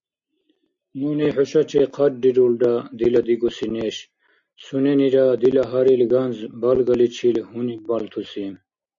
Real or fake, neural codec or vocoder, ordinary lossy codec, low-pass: real; none; MP3, 48 kbps; 7.2 kHz